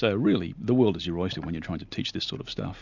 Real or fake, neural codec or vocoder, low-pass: real; none; 7.2 kHz